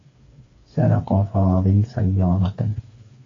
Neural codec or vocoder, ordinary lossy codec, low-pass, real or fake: codec, 16 kHz, 4 kbps, FreqCodec, smaller model; AAC, 32 kbps; 7.2 kHz; fake